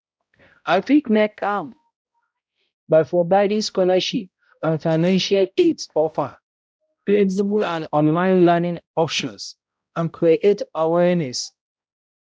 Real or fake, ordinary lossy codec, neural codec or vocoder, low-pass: fake; none; codec, 16 kHz, 0.5 kbps, X-Codec, HuBERT features, trained on balanced general audio; none